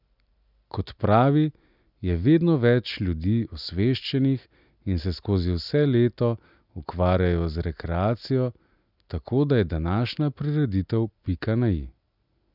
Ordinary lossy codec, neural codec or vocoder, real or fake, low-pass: none; none; real; 5.4 kHz